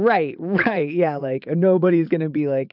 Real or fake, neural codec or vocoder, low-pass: fake; vocoder, 22.05 kHz, 80 mel bands, Vocos; 5.4 kHz